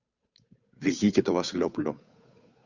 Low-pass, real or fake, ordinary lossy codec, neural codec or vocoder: 7.2 kHz; fake; Opus, 64 kbps; codec, 16 kHz, 8 kbps, FunCodec, trained on Chinese and English, 25 frames a second